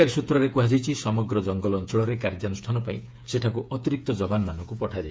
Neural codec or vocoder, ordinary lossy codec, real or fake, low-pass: codec, 16 kHz, 8 kbps, FreqCodec, smaller model; none; fake; none